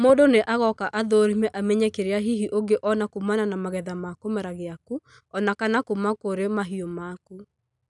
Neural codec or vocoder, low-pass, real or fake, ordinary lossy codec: none; 10.8 kHz; real; none